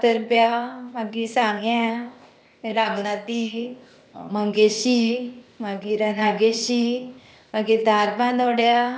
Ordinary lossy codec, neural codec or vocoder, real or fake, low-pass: none; codec, 16 kHz, 0.8 kbps, ZipCodec; fake; none